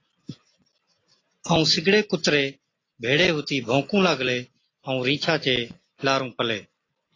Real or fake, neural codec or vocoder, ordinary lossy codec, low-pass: real; none; AAC, 32 kbps; 7.2 kHz